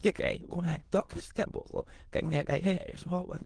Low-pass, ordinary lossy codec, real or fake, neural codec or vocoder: 9.9 kHz; Opus, 16 kbps; fake; autoencoder, 22.05 kHz, a latent of 192 numbers a frame, VITS, trained on many speakers